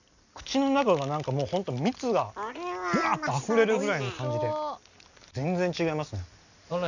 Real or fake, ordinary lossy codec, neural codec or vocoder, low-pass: real; none; none; 7.2 kHz